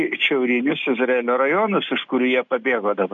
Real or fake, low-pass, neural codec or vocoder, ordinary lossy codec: real; 7.2 kHz; none; MP3, 64 kbps